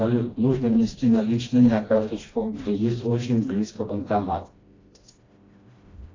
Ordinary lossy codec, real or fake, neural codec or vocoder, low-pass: AAC, 32 kbps; fake; codec, 16 kHz, 1 kbps, FreqCodec, smaller model; 7.2 kHz